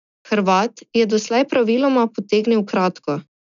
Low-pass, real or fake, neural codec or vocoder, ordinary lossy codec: 7.2 kHz; real; none; none